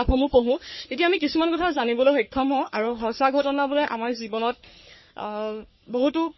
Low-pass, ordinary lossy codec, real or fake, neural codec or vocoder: 7.2 kHz; MP3, 24 kbps; fake; codec, 44.1 kHz, 3.4 kbps, Pupu-Codec